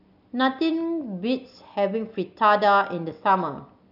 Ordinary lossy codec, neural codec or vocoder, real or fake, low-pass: none; none; real; 5.4 kHz